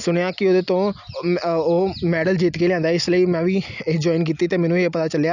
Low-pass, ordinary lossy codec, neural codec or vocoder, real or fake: 7.2 kHz; none; none; real